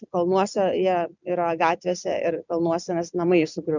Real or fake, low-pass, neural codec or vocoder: real; 7.2 kHz; none